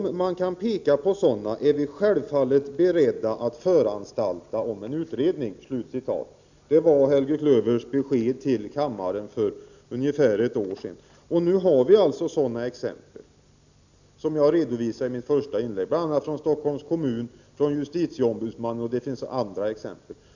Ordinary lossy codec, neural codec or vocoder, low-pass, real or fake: none; none; 7.2 kHz; real